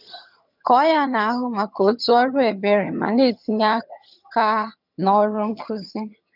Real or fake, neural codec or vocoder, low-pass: fake; vocoder, 22.05 kHz, 80 mel bands, HiFi-GAN; 5.4 kHz